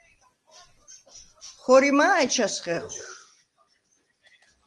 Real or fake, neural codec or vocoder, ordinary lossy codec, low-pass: real; none; Opus, 24 kbps; 10.8 kHz